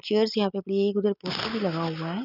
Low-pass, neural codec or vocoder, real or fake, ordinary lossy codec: 5.4 kHz; none; real; none